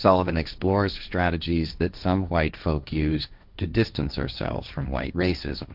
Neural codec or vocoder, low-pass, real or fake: codec, 16 kHz, 1.1 kbps, Voila-Tokenizer; 5.4 kHz; fake